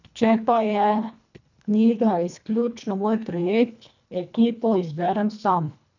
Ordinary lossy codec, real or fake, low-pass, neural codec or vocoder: none; fake; 7.2 kHz; codec, 24 kHz, 1.5 kbps, HILCodec